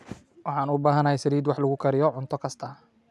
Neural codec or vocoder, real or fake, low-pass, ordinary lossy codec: none; real; none; none